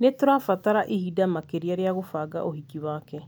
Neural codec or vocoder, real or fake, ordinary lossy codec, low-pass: none; real; none; none